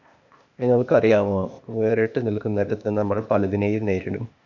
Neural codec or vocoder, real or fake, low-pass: codec, 16 kHz, 0.8 kbps, ZipCodec; fake; 7.2 kHz